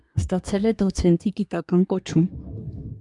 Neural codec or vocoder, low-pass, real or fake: codec, 24 kHz, 1 kbps, SNAC; 10.8 kHz; fake